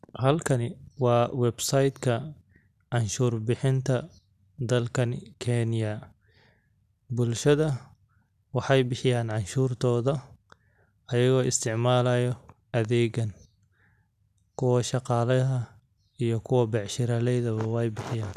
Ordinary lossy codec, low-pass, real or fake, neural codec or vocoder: none; 14.4 kHz; real; none